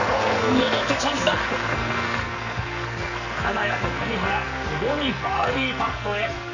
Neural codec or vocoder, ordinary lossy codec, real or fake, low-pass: codec, 44.1 kHz, 2.6 kbps, SNAC; none; fake; 7.2 kHz